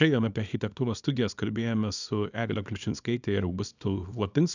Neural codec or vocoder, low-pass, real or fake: codec, 24 kHz, 0.9 kbps, WavTokenizer, small release; 7.2 kHz; fake